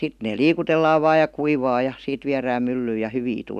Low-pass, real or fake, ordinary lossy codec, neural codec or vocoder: 14.4 kHz; real; none; none